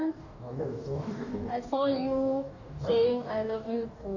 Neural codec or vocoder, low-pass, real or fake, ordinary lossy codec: codec, 44.1 kHz, 2.6 kbps, DAC; 7.2 kHz; fake; none